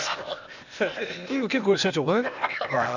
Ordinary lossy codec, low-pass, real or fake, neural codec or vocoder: none; 7.2 kHz; fake; codec, 16 kHz, 0.8 kbps, ZipCodec